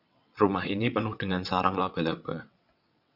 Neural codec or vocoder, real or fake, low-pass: vocoder, 22.05 kHz, 80 mel bands, WaveNeXt; fake; 5.4 kHz